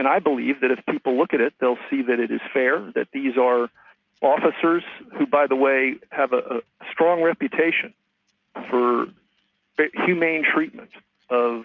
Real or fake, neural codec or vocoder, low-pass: real; none; 7.2 kHz